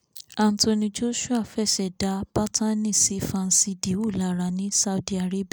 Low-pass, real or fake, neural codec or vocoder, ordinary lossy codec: none; real; none; none